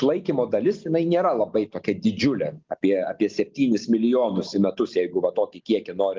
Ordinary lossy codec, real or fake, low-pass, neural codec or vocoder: Opus, 24 kbps; real; 7.2 kHz; none